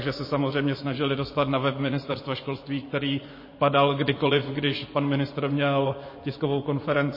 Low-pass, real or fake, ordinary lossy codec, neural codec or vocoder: 5.4 kHz; fake; MP3, 24 kbps; vocoder, 24 kHz, 100 mel bands, Vocos